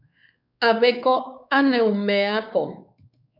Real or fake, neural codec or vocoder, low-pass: fake; codec, 16 kHz, 4 kbps, X-Codec, WavLM features, trained on Multilingual LibriSpeech; 5.4 kHz